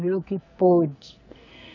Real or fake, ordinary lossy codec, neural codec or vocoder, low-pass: fake; none; codec, 44.1 kHz, 2.6 kbps, SNAC; 7.2 kHz